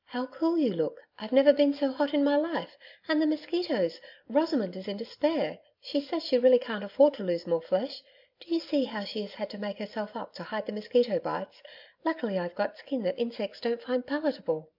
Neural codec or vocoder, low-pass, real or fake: none; 5.4 kHz; real